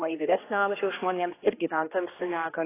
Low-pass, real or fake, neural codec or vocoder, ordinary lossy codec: 3.6 kHz; fake; codec, 16 kHz, 1 kbps, X-Codec, HuBERT features, trained on general audio; AAC, 16 kbps